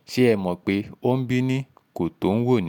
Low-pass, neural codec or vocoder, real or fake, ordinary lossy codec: 19.8 kHz; none; real; none